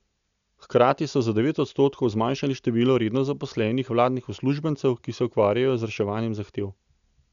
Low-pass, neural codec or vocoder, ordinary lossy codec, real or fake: 7.2 kHz; none; none; real